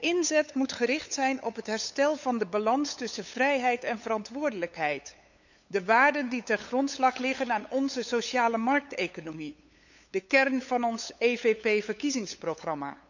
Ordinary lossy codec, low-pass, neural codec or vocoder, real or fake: none; 7.2 kHz; codec, 16 kHz, 8 kbps, FunCodec, trained on LibriTTS, 25 frames a second; fake